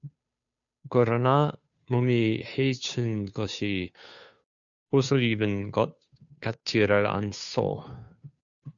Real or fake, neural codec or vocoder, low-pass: fake; codec, 16 kHz, 2 kbps, FunCodec, trained on Chinese and English, 25 frames a second; 7.2 kHz